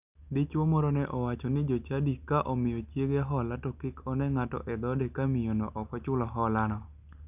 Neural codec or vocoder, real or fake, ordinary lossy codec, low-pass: none; real; none; 3.6 kHz